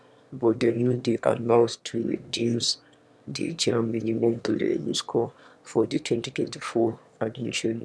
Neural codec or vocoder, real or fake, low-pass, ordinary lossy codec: autoencoder, 22.05 kHz, a latent of 192 numbers a frame, VITS, trained on one speaker; fake; none; none